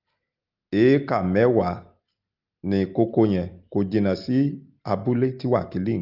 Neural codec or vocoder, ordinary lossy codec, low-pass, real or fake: none; Opus, 32 kbps; 5.4 kHz; real